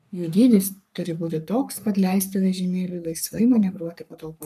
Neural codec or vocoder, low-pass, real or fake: codec, 44.1 kHz, 3.4 kbps, Pupu-Codec; 14.4 kHz; fake